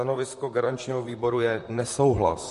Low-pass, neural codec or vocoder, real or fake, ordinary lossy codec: 14.4 kHz; vocoder, 44.1 kHz, 128 mel bands, Pupu-Vocoder; fake; MP3, 48 kbps